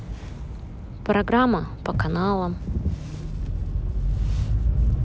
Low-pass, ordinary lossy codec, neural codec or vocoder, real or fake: none; none; none; real